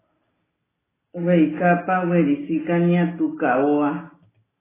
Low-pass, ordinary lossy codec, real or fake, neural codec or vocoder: 3.6 kHz; AAC, 16 kbps; real; none